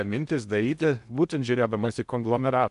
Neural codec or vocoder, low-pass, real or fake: codec, 16 kHz in and 24 kHz out, 0.6 kbps, FocalCodec, streaming, 2048 codes; 10.8 kHz; fake